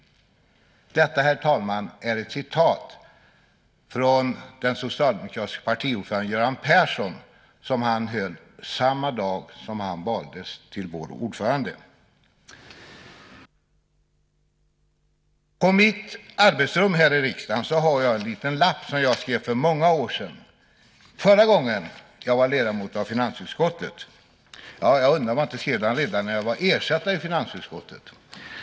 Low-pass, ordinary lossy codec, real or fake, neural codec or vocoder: none; none; real; none